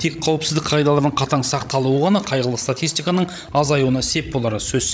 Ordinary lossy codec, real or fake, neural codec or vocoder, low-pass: none; fake; codec, 16 kHz, 16 kbps, FreqCodec, larger model; none